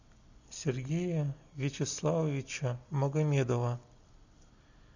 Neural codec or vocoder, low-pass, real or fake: none; 7.2 kHz; real